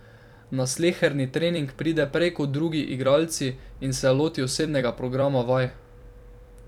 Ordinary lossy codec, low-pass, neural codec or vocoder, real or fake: none; 19.8 kHz; vocoder, 44.1 kHz, 128 mel bands every 256 samples, BigVGAN v2; fake